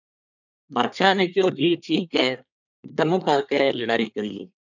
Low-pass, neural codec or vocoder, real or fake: 7.2 kHz; codec, 24 kHz, 1 kbps, SNAC; fake